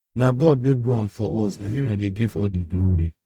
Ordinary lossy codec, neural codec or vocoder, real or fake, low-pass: Opus, 64 kbps; codec, 44.1 kHz, 0.9 kbps, DAC; fake; 19.8 kHz